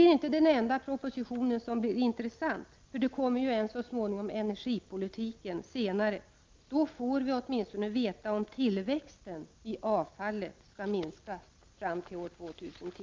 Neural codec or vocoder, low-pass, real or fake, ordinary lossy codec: none; 7.2 kHz; real; Opus, 32 kbps